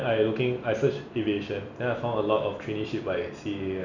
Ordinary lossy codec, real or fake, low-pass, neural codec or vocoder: none; real; 7.2 kHz; none